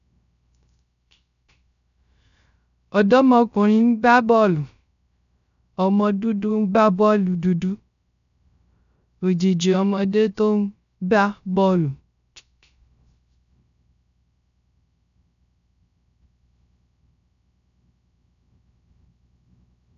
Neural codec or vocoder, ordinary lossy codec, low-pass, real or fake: codec, 16 kHz, 0.3 kbps, FocalCodec; none; 7.2 kHz; fake